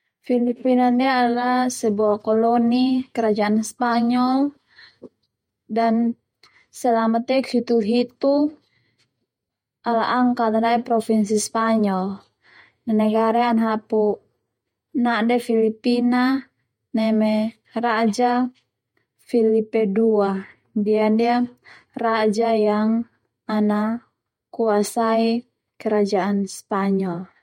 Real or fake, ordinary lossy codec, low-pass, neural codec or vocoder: fake; MP3, 64 kbps; 19.8 kHz; vocoder, 48 kHz, 128 mel bands, Vocos